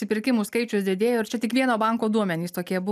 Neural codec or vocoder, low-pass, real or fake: none; 14.4 kHz; real